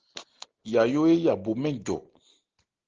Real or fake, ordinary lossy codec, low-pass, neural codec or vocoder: real; Opus, 16 kbps; 7.2 kHz; none